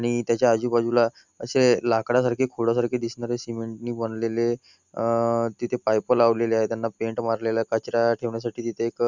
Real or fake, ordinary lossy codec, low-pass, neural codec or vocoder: real; none; 7.2 kHz; none